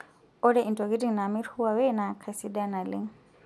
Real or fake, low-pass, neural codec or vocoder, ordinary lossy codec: real; none; none; none